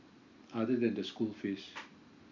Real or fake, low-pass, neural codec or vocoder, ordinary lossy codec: real; 7.2 kHz; none; none